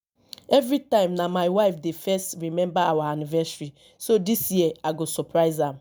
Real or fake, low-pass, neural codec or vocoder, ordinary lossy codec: real; none; none; none